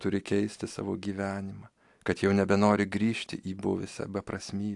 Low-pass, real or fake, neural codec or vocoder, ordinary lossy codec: 10.8 kHz; real; none; AAC, 64 kbps